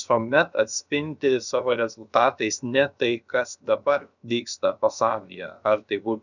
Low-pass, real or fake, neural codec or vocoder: 7.2 kHz; fake; codec, 16 kHz, about 1 kbps, DyCAST, with the encoder's durations